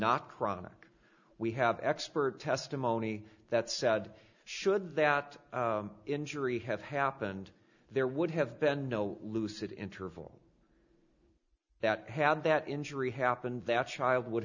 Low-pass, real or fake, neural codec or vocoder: 7.2 kHz; real; none